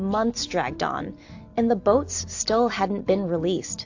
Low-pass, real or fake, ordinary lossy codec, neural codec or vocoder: 7.2 kHz; real; AAC, 48 kbps; none